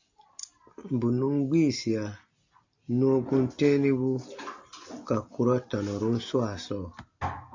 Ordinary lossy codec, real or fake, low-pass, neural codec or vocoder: AAC, 48 kbps; real; 7.2 kHz; none